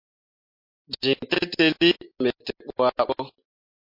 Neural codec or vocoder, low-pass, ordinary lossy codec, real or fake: none; 5.4 kHz; MP3, 32 kbps; real